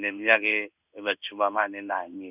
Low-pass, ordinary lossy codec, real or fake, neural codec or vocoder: 3.6 kHz; none; fake; codec, 16 kHz in and 24 kHz out, 1 kbps, XY-Tokenizer